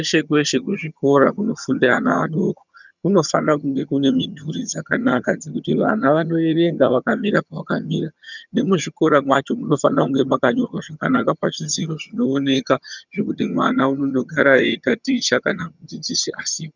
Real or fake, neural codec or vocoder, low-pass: fake; vocoder, 22.05 kHz, 80 mel bands, HiFi-GAN; 7.2 kHz